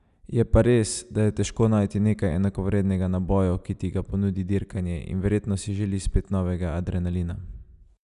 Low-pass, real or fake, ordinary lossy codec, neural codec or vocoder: 10.8 kHz; real; none; none